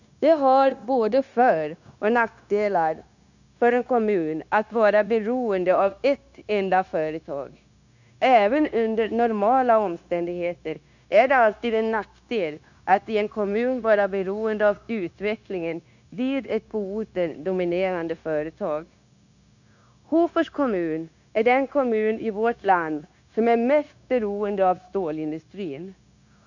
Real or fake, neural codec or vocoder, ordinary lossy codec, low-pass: fake; codec, 16 kHz, 0.9 kbps, LongCat-Audio-Codec; none; 7.2 kHz